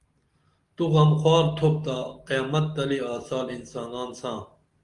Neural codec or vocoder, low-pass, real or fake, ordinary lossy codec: none; 10.8 kHz; real; Opus, 24 kbps